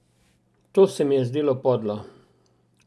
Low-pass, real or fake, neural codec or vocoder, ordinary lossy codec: none; real; none; none